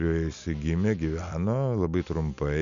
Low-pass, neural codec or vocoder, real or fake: 7.2 kHz; none; real